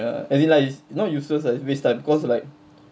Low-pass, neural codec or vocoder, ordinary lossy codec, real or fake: none; none; none; real